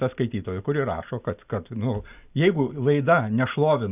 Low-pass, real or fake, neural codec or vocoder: 3.6 kHz; real; none